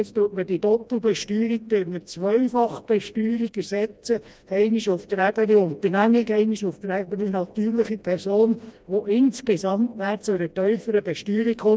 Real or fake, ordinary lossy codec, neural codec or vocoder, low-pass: fake; none; codec, 16 kHz, 1 kbps, FreqCodec, smaller model; none